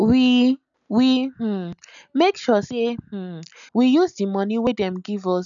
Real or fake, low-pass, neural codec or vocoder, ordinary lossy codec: real; 7.2 kHz; none; none